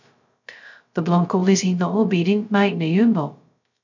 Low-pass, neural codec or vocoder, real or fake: 7.2 kHz; codec, 16 kHz, 0.2 kbps, FocalCodec; fake